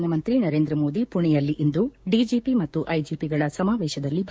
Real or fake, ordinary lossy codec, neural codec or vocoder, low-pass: fake; none; codec, 16 kHz, 6 kbps, DAC; none